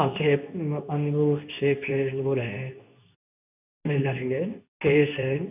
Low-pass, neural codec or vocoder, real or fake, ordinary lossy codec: 3.6 kHz; codec, 24 kHz, 0.9 kbps, WavTokenizer, medium speech release version 2; fake; none